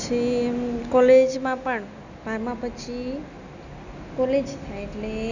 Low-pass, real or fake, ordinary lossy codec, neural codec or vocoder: 7.2 kHz; real; none; none